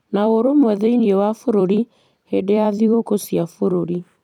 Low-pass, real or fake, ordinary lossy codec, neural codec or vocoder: 19.8 kHz; fake; none; vocoder, 44.1 kHz, 128 mel bands every 256 samples, BigVGAN v2